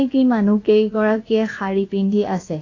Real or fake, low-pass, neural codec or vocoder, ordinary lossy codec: fake; 7.2 kHz; codec, 16 kHz, about 1 kbps, DyCAST, with the encoder's durations; AAC, 32 kbps